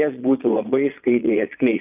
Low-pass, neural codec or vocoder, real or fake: 3.6 kHz; vocoder, 22.05 kHz, 80 mel bands, Vocos; fake